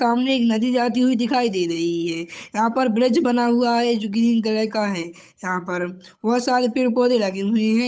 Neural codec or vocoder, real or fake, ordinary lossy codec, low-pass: codec, 16 kHz, 8 kbps, FunCodec, trained on Chinese and English, 25 frames a second; fake; none; none